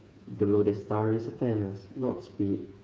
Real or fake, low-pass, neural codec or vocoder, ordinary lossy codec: fake; none; codec, 16 kHz, 4 kbps, FreqCodec, smaller model; none